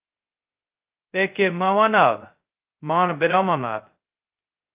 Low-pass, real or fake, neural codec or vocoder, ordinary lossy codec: 3.6 kHz; fake; codec, 16 kHz, 0.2 kbps, FocalCodec; Opus, 24 kbps